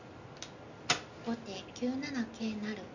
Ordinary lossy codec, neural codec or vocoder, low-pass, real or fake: none; none; 7.2 kHz; real